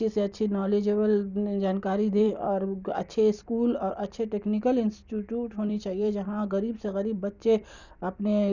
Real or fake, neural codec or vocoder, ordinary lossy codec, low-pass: fake; vocoder, 44.1 kHz, 128 mel bands every 256 samples, BigVGAN v2; none; 7.2 kHz